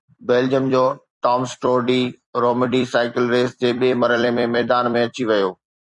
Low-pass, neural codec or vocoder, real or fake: 10.8 kHz; vocoder, 44.1 kHz, 128 mel bands every 256 samples, BigVGAN v2; fake